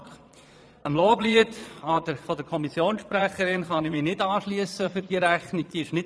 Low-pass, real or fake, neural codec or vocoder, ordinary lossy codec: none; fake; vocoder, 22.05 kHz, 80 mel bands, Vocos; none